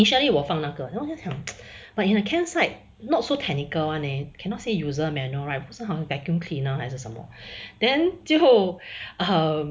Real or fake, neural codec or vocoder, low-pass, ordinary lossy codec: real; none; none; none